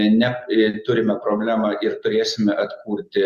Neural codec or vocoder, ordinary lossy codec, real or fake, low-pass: none; Opus, 64 kbps; real; 14.4 kHz